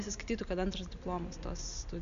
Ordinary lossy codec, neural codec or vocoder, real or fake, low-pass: Opus, 64 kbps; none; real; 7.2 kHz